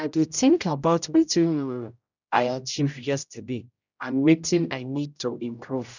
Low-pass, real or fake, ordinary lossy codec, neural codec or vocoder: 7.2 kHz; fake; none; codec, 16 kHz, 0.5 kbps, X-Codec, HuBERT features, trained on general audio